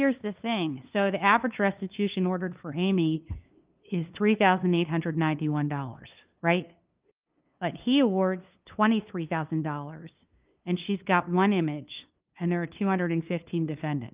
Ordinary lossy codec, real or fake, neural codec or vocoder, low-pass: Opus, 32 kbps; fake; codec, 16 kHz, 2 kbps, FunCodec, trained on LibriTTS, 25 frames a second; 3.6 kHz